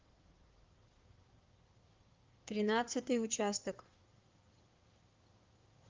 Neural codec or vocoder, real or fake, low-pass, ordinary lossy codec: codec, 16 kHz in and 24 kHz out, 2.2 kbps, FireRedTTS-2 codec; fake; 7.2 kHz; Opus, 32 kbps